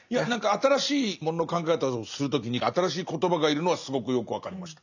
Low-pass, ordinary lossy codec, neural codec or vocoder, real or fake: 7.2 kHz; none; none; real